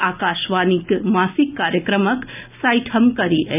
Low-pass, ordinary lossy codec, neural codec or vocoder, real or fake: 3.6 kHz; none; none; real